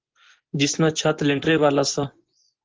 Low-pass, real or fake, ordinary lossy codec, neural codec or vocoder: 7.2 kHz; fake; Opus, 16 kbps; vocoder, 24 kHz, 100 mel bands, Vocos